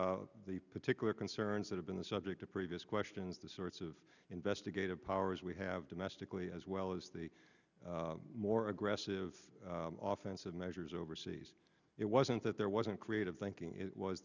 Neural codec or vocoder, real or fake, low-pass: none; real; 7.2 kHz